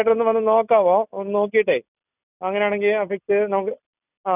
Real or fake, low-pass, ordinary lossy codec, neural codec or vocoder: real; 3.6 kHz; none; none